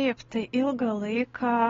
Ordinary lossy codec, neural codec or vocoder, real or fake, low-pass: AAC, 24 kbps; none; real; 7.2 kHz